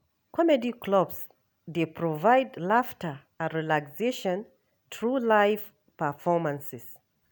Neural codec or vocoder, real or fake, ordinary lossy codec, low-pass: none; real; none; none